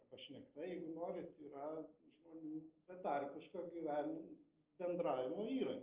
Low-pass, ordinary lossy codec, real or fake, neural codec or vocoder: 3.6 kHz; Opus, 32 kbps; real; none